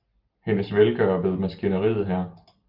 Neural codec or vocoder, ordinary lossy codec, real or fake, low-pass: none; Opus, 24 kbps; real; 5.4 kHz